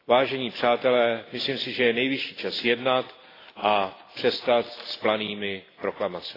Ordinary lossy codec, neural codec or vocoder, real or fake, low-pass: AAC, 24 kbps; none; real; 5.4 kHz